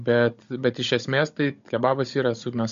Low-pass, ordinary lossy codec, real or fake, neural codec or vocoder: 7.2 kHz; MP3, 48 kbps; fake; codec, 16 kHz, 16 kbps, FunCodec, trained on Chinese and English, 50 frames a second